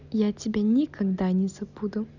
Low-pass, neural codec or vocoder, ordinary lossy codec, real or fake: 7.2 kHz; none; none; real